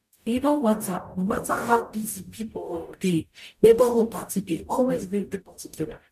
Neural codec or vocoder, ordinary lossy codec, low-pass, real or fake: codec, 44.1 kHz, 0.9 kbps, DAC; none; 14.4 kHz; fake